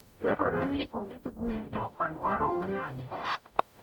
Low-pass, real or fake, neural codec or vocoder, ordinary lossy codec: 19.8 kHz; fake; codec, 44.1 kHz, 0.9 kbps, DAC; none